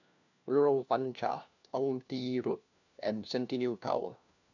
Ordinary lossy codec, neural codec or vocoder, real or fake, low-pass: none; codec, 16 kHz, 1 kbps, FunCodec, trained on LibriTTS, 50 frames a second; fake; 7.2 kHz